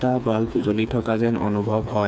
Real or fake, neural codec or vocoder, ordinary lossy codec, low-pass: fake; codec, 16 kHz, 4 kbps, FreqCodec, smaller model; none; none